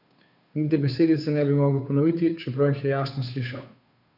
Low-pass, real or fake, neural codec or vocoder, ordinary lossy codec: 5.4 kHz; fake; codec, 16 kHz, 2 kbps, FunCodec, trained on Chinese and English, 25 frames a second; none